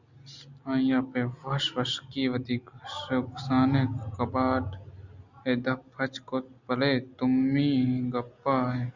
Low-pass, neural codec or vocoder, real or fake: 7.2 kHz; none; real